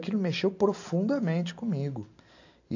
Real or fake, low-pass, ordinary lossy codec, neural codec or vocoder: real; 7.2 kHz; AAC, 48 kbps; none